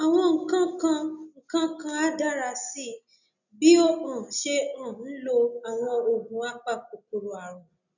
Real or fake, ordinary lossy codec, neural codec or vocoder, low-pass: real; none; none; 7.2 kHz